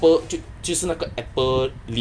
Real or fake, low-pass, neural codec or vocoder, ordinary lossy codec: real; none; none; none